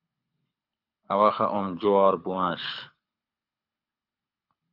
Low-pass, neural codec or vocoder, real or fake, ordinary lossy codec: 5.4 kHz; codec, 24 kHz, 6 kbps, HILCodec; fake; AAC, 48 kbps